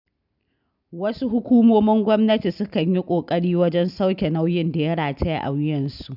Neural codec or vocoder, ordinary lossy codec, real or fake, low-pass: none; none; real; 5.4 kHz